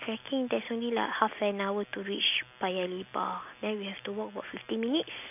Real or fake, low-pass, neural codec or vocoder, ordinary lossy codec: real; 3.6 kHz; none; AAC, 32 kbps